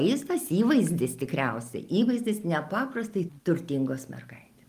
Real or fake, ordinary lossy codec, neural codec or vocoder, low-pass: real; Opus, 32 kbps; none; 14.4 kHz